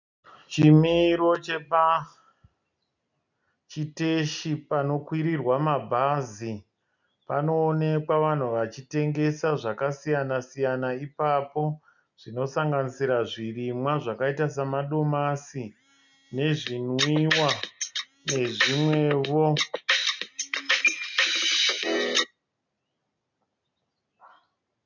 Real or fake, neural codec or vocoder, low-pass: real; none; 7.2 kHz